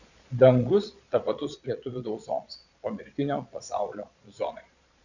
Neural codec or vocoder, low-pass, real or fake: vocoder, 22.05 kHz, 80 mel bands, Vocos; 7.2 kHz; fake